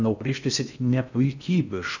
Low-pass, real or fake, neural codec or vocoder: 7.2 kHz; fake; codec, 16 kHz in and 24 kHz out, 0.6 kbps, FocalCodec, streaming, 2048 codes